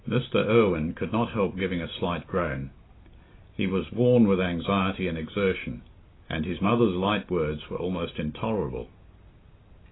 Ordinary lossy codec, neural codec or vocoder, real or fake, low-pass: AAC, 16 kbps; none; real; 7.2 kHz